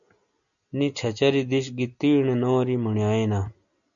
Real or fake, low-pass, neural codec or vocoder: real; 7.2 kHz; none